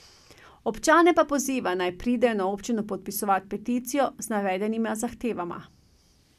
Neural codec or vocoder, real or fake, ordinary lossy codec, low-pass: none; real; none; 14.4 kHz